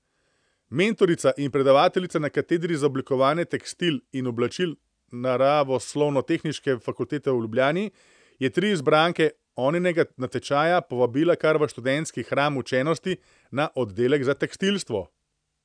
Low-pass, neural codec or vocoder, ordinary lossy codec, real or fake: 9.9 kHz; none; none; real